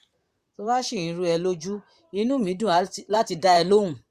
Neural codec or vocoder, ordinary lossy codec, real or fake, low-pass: vocoder, 44.1 kHz, 128 mel bands, Pupu-Vocoder; Opus, 64 kbps; fake; 14.4 kHz